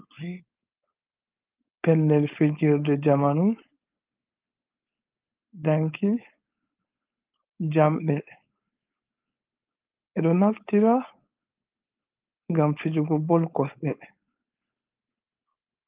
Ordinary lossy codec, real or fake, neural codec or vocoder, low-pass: Opus, 32 kbps; fake; codec, 16 kHz, 4.8 kbps, FACodec; 3.6 kHz